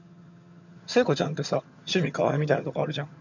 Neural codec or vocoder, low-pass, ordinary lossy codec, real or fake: vocoder, 22.05 kHz, 80 mel bands, HiFi-GAN; 7.2 kHz; none; fake